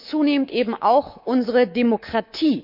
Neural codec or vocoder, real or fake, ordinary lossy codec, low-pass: codec, 24 kHz, 3.1 kbps, DualCodec; fake; none; 5.4 kHz